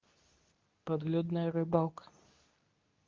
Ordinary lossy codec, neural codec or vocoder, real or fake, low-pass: Opus, 24 kbps; codec, 24 kHz, 0.9 kbps, WavTokenizer, medium speech release version 2; fake; 7.2 kHz